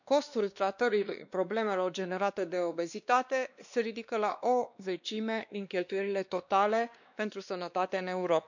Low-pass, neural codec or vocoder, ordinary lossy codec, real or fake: 7.2 kHz; codec, 16 kHz, 2 kbps, X-Codec, WavLM features, trained on Multilingual LibriSpeech; none; fake